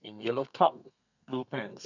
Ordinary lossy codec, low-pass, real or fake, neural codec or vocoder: none; 7.2 kHz; fake; codec, 32 kHz, 1.9 kbps, SNAC